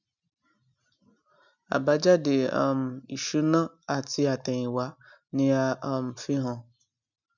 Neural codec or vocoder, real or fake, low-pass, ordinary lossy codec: none; real; 7.2 kHz; none